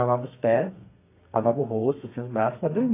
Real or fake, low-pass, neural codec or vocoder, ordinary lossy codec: fake; 3.6 kHz; codec, 32 kHz, 1.9 kbps, SNAC; AAC, 24 kbps